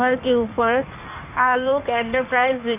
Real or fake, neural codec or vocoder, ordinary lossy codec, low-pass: fake; codec, 16 kHz in and 24 kHz out, 1.1 kbps, FireRedTTS-2 codec; none; 3.6 kHz